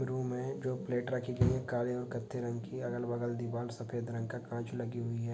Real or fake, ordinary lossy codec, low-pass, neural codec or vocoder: real; none; none; none